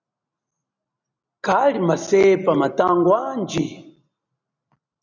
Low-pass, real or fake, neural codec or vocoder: 7.2 kHz; real; none